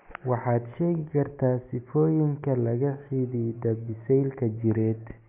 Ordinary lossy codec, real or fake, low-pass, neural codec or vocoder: MP3, 32 kbps; real; 3.6 kHz; none